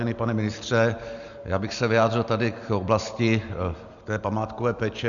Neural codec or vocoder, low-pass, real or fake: none; 7.2 kHz; real